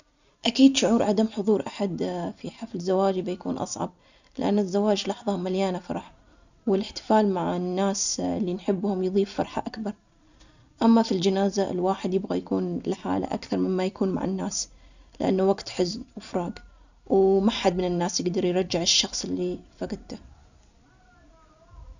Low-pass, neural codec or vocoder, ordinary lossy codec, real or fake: 7.2 kHz; none; none; real